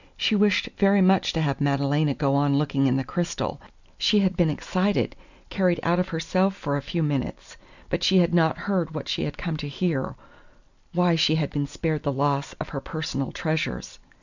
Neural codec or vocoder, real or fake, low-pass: none; real; 7.2 kHz